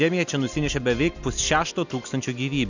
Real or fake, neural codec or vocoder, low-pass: real; none; 7.2 kHz